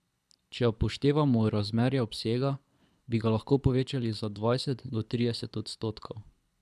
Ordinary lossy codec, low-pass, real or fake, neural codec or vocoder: none; none; fake; codec, 24 kHz, 6 kbps, HILCodec